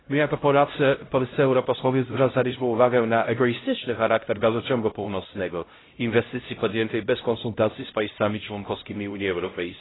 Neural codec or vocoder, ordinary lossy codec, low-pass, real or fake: codec, 16 kHz, 0.5 kbps, X-Codec, HuBERT features, trained on LibriSpeech; AAC, 16 kbps; 7.2 kHz; fake